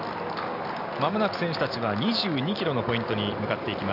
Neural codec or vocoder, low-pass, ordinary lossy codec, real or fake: none; 5.4 kHz; none; real